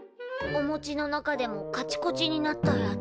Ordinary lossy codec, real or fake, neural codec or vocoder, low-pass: none; real; none; none